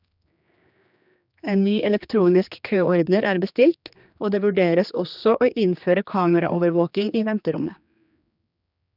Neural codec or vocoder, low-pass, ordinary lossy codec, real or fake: codec, 16 kHz, 2 kbps, X-Codec, HuBERT features, trained on general audio; 5.4 kHz; none; fake